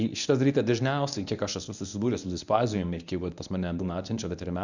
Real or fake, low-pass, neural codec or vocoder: fake; 7.2 kHz; codec, 24 kHz, 0.9 kbps, WavTokenizer, medium speech release version 1